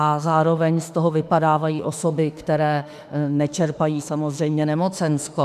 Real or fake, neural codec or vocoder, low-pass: fake; autoencoder, 48 kHz, 32 numbers a frame, DAC-VAE, trained on Japanese speech; 14.4 kHz